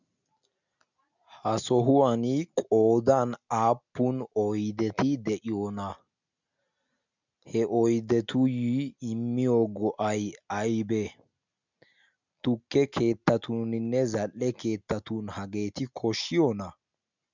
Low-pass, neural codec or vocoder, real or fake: 7.2 kHz; none; real